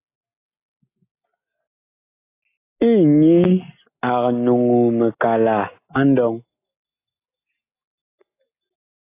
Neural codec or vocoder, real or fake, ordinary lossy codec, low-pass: none; real; AAC, 24 kbps; 3.6 kHz